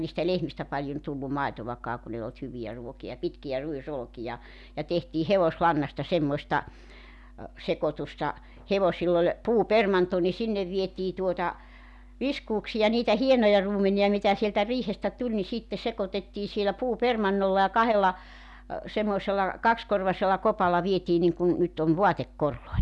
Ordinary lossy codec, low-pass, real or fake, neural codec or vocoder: none; none; real; none